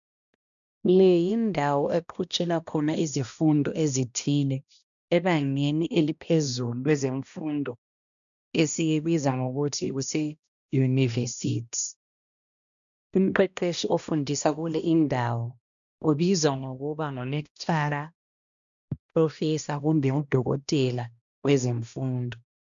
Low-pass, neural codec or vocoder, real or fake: 7.2 kHz; codec, 16 kHz, 1 kbps, X-Codec, HuBERT features, trained on balanced general audio; fake